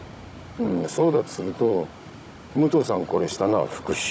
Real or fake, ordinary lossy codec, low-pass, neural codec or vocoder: fake; none; none; codec, 16 kHz, 16 kbps, FunCodec, trained on Chinese and English, 50 frames a second